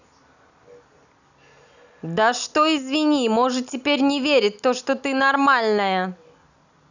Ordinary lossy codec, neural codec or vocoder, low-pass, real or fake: none; none; 7.2 kHz; real